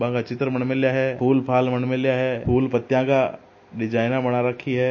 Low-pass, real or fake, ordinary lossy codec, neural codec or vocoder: 7.2 kHz; real; MP3, 32 kbps; none